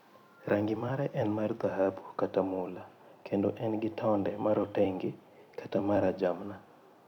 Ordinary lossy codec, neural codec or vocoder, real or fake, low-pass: none; vocoder, 44.1 kHz, 128 mel bands every 256 samples, BigVGAN v2; fake; 19.8 kHz